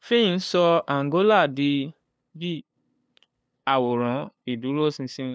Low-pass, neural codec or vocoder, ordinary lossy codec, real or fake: none; codec, 16 kHz, 2 kbps, FunCodec, trained on LibriTTS, 25 frames a second; none; fake